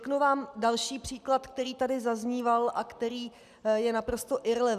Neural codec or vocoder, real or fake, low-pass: none; real; 14.4 kHz